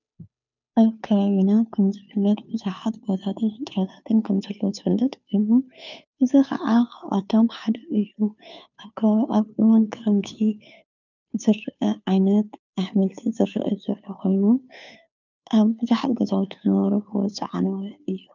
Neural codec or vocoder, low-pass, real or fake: codec, 16 kHz, 2 kbps, FunCodec, trained on Chinese and English, 25 frames a second; 7.2 kHz; fake